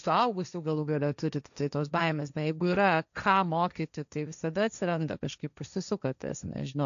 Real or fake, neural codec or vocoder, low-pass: fake; codec, 16 kHz, 1.1 kbps, Voila-Tokenizer; 7.2 kHz